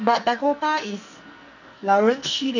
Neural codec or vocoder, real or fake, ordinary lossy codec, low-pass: codec, 44.1 kHz, 2.6 kbps, SNAC; fake; none; 7.2 kHz